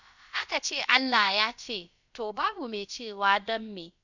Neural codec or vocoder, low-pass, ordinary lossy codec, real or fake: codec, 16 kHz, about 1 kbps, DyCAST, with the encoder's durations; 7.2 kHz; none; fake